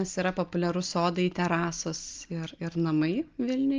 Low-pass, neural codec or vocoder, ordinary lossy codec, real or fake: 7.2 kHz; none; Opus, 24 kbps; real